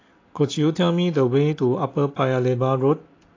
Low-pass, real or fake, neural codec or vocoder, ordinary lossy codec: 7.2 kHz; real; none; AAC, 32 kbps